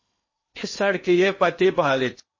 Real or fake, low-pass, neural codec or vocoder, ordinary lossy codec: fake; 7.2 kHz; codec, 16 kHz in and 24 kHz out, 0.8 kbps, FocalCodec, streaming, 65536 codes; MP3, 32 kbps